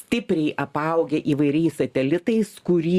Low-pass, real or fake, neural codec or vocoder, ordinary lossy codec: 14.4 kHz; real; none; Opus, 64 kbps